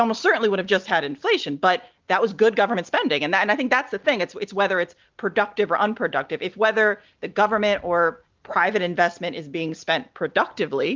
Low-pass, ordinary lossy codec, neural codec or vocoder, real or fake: 7.2 kHz; Opus, 32 kbps; none; real